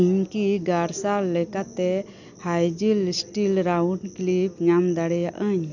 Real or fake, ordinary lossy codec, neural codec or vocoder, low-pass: real; none; none; 7.2 kHz